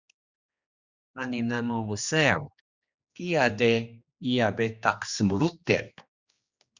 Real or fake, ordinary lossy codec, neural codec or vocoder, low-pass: fake; Opus, 64 kbps; codec, 16 kHz, 2 kbps, X-Codec, HuBERT features, trained on general audio; 7.2 kHz